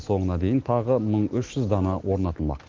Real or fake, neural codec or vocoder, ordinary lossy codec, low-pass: real; none; Opus, 16 kbps; 7.2 kHz